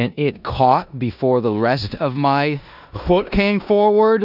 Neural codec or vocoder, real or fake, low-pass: codec, 16 kHz in and 24 kHz out, 0.9 kbps, LongCat-Audio-Codec, four codebook decoder; fake; 5.4 kHz